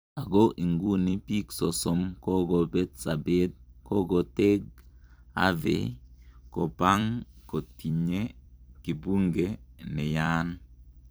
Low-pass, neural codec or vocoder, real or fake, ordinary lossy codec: none; vocoder, 44.1 kHz, 128 mel bands every 256 samples, BigVGAN v2; fake; none